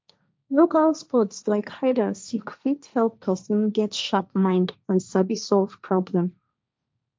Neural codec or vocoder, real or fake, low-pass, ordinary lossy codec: codec, 16 kHz, 1.1 kbps, Voila-Tokenizer; fake; none; none